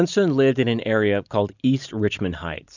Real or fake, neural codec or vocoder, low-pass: real; none; 7.2 kHz